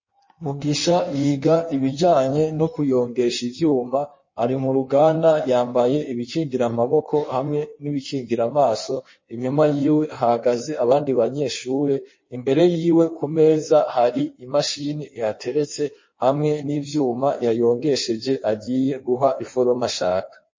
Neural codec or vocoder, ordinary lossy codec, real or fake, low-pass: codec, 16 kHz in and 24 kHz out, 1.1 kbps, FireRedTTS-2 codec; MP3, 32 kbps; fake; 7.2 kHz